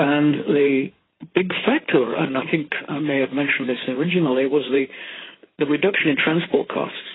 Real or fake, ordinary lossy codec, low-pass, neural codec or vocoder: fake; AAC, 16 kbps; 7.2 kHz; codec, 16 kHz in and 24 kHz out, 2.2 kbps, FireRedTTS-2 codec